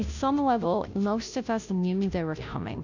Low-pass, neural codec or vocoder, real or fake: 7.2 kHz; codec, 16 kHz, 0.5 kbps, FunCodec, trained on Chinese and English, 25 frames a second; fake